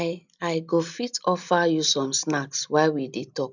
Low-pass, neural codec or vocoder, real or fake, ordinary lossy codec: 7.2 kHz; none; real; none